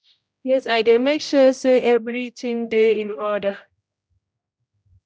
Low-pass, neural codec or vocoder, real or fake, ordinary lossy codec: none; codec, 16 kHz, 0.5 kbps, X-Codec, HuBERT features, trained on general audio; fake; none